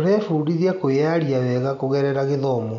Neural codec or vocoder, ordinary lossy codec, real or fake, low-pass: none; none; real; 7.2 kHz